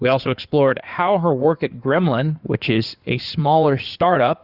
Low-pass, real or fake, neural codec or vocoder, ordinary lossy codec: 5.4 kHz; fake; codec, 16 kHz in and 24 kHz out, 2.2 kbps, FireRedTTS-2 codec; Opus, 64 kbps